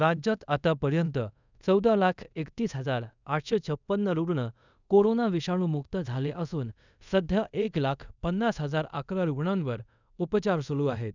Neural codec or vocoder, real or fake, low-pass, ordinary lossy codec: codec, 24 kHz, 0.5 kbps, DualCodec; fake; 7.2 kHz; none